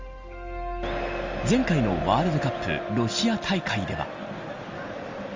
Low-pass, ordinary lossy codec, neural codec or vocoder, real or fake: 7.2 kHz; Opus, 32 kbps; none; real